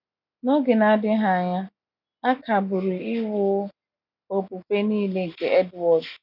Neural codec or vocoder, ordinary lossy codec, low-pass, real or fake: none; none; 5.4 kHz; real